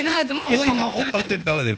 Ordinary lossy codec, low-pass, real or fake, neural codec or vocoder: none; none; fake; codec, 16 kHz, 0.8 kbps, ZipCodec